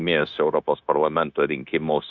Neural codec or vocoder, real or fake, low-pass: codec, 16 kHz, 0.9 kbps, LongCat-Audio-Codec; fake; 7.2 kHz